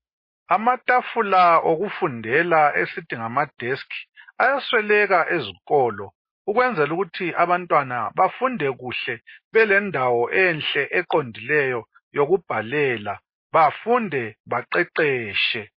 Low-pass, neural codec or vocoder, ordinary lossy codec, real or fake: 5.4 kHz; none; MP3, 24 kbps; real